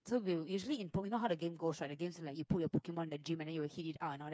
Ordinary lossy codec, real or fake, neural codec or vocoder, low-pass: none; fake; codec, 16 kHz, 4 kbps, FreqCodec, smaller model; none